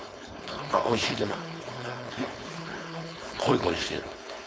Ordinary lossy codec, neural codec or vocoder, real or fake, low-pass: none; codec, 16 kHz, 4.8 kbps, FACodec; fake; none